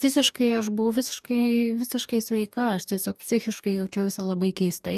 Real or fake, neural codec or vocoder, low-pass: fake; codec, 44.1 kHz, 2.6 kbps, DAC; 14.4 kHz